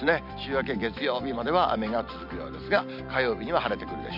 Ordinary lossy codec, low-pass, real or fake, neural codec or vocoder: none; 5.4 kHz; real; none